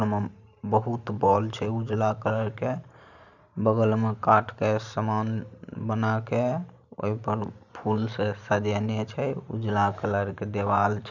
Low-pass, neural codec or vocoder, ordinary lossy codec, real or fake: 7.2 kHz; vocoder, 44.1 kHz, 128 mel bands every 512 samples, BigVGAN v2; none; fake